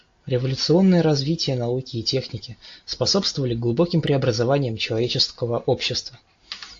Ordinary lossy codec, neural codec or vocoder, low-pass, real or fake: AAC, 48 kbps; none; 7.2 kHz; real